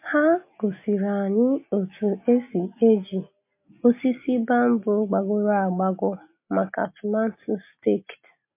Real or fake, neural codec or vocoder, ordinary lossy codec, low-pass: real; none; MP3, 24 kbps; 3.6 kHz